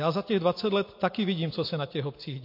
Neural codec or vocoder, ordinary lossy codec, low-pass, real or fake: none; MP3, 32 kbps; 5.4 kHz; real